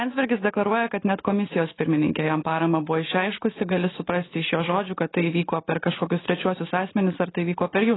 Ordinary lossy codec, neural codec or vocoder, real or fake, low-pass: AAC, 16 kbps; none; real; 7.2 kHz